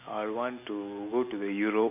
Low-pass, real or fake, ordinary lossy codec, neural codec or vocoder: 3.6 kHz; real; none; none